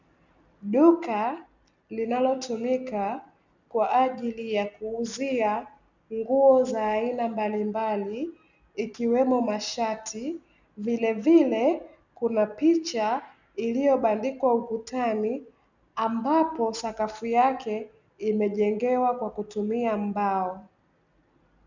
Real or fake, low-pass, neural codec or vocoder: real; 7.2 kHz; none